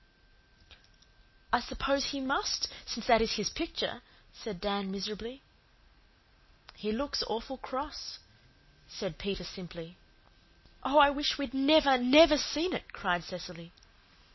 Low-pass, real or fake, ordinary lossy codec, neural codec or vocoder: 7.2 kHz; real; MP3, 24 kbps; none